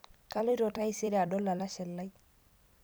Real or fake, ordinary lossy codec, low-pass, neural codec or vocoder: fake; none; none; vocoder, 44.1 kHz, 128 mel bands every 256 samples, BigVGAN v2